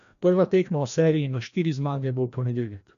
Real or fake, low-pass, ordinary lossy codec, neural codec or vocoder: fake; 7.2 kHz; none; codec, 16 kHz, 1 kbps, FreqCodec, larger model